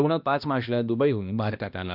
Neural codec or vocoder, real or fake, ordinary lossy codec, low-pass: codec, 16 kHz, 1 kbps, X-Codec, HuBERT features, trained on balanced general audio; fake; none; 5.4 kHz